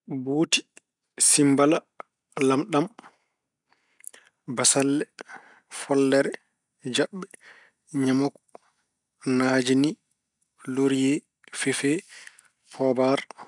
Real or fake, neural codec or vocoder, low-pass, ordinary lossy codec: real; none; 10.8 kHz; none